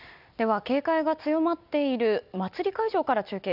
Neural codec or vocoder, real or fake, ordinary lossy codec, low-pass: none; real; none; 5.4 kHz